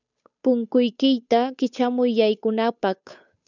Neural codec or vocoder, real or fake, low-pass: codec, 16 kHz, 8 kbps, FunCodec, trained on Chinese and English, 25 frames a second; fake; 7.2 kHz